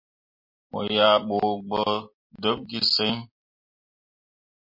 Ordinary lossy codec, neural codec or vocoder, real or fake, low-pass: MP3, 32 kbps; none; real; 5.4 kHz